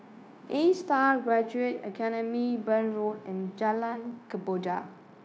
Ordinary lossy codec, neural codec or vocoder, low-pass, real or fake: none; codec, 16 kHz, 0.9 kbps, LongCat-Audio-Codec; none; fake